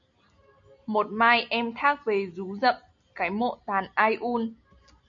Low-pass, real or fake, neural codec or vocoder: 7.2 kHz; real; none